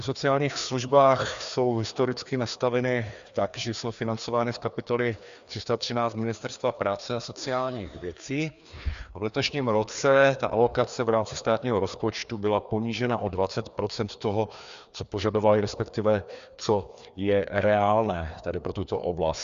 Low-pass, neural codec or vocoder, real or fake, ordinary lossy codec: 7.2 kHz; codec, 16 kHz, 2 kbps, FreqCodec, larger model; fake; Opus, 64 kbps